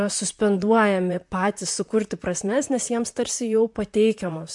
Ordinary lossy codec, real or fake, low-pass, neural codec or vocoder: MP3, 64 kbps; fake; 10.8 kHz; vocoder, 44.1 kHz, 128 mel bands, Pupu-Vocoder